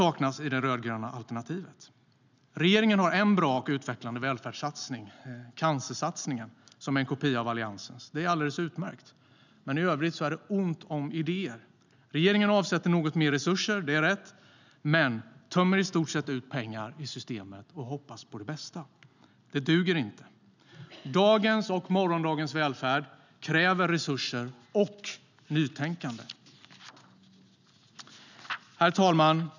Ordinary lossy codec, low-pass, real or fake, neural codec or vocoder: none; 7.2 kHz; real; none